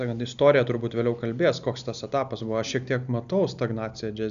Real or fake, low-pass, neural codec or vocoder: real; 7.2 kHz; none